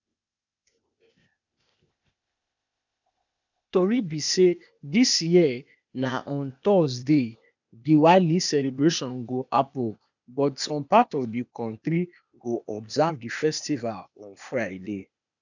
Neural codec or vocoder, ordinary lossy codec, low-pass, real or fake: codec, 16 kHz, 0.8 kbps, ZipCodec; none; 7.2 kHz; fake